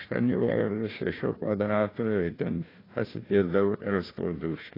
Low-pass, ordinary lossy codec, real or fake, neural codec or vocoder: 5.4 kHz; AAC, 24 kbps; fake; codec, 16 kHz, 1 kbps, FunCodec, trained on Chinese and English, 50 frames a second